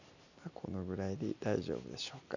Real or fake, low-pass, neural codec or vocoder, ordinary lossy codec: real; 7.2 kHz; none; none